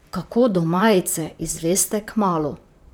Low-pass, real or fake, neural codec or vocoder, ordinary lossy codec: none; fake; vocoder, 44.1 kHz, 128 mel bands, Pupu-Vocoder; none